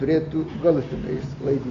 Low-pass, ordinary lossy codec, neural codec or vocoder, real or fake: 7.2 kHz; AAC, 48 kbps; none; real